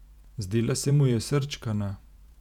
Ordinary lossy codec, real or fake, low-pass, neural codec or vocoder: none; fake; 19.8 kHz; vocoder, 48 kHz, 128 mel bands, Vocos